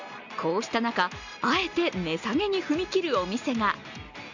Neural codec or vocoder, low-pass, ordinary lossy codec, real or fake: none; 7.2 kHz; none; real